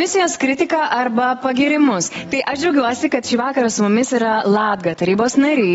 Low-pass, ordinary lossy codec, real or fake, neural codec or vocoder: 19.8 kHz; AAC, 24 kbps; real; none